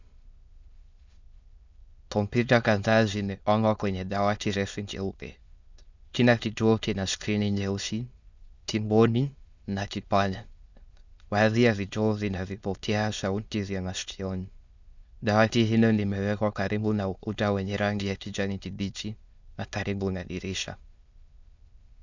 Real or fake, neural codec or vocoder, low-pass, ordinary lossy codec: fake; autoencoder, 22.05 kHz, a latent of 192 numbers a frame, VITS, trained on many speakers; 7.2 kHz; Opus, 64 kbps